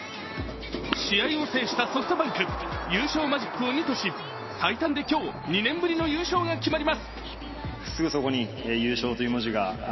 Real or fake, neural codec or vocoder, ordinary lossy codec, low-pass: real; none; MP3, 24 kbps; 7.2 kHz